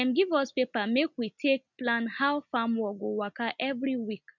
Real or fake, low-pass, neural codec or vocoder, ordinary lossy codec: real; 7.2 kHz; none; none